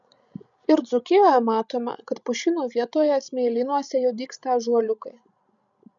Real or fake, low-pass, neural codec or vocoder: fake; 7.2 kHz; codec, 16 kHz, 16 kbps, FreqCodec, larger model